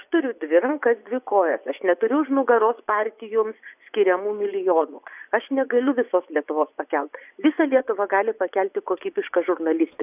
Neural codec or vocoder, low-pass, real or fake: vocoder, 24 kHz, 100 mel bands, Vocos; 3.6 kHz; fake